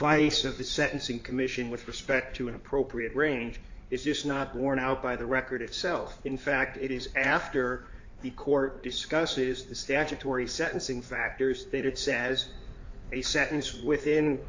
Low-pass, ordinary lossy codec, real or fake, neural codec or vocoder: 7.2 kHz; AAC, 48 kbps; fake; codec, 16 kHz in and 24 kHz out, 2.2 kbps, FireRedTTS-2 codec